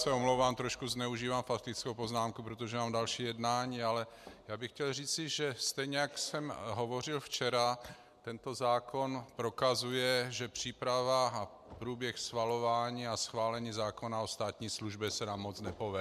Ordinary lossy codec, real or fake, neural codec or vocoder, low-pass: MP3, 96 kbps; real; none; 14.4 kHz